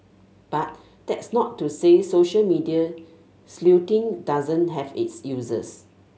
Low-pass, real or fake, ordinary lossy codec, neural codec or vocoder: none; real; none; none